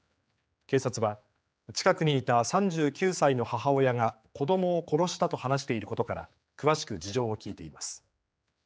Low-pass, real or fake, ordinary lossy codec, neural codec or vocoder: none; fake; none; codec, 16 kHz, 4 kbps, X-Codec, HuBERT features, trained on general audio